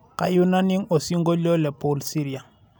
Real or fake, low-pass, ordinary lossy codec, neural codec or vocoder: real; none; none; none